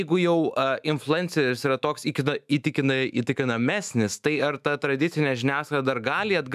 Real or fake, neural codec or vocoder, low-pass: fake; autoencoder, 48 kHz, 128 numbers a frame, DAC-VAE, trained on Japanese speech; 14.4 kHz